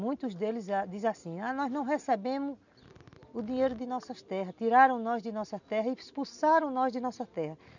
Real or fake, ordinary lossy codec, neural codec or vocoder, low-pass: real; none; none; 7.2 kHz